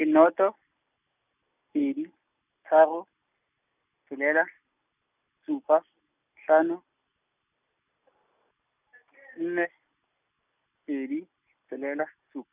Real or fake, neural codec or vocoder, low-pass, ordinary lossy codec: real; none; 3.6 kHz; none